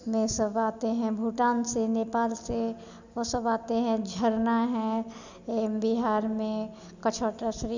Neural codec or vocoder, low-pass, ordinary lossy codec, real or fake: none; 7.2 kHz; none; real